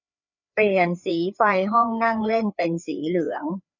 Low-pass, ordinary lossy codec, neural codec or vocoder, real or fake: 7.2 kHz; none; codec, 16 kHz, 4 kbps, FreqCodec, larger model; fake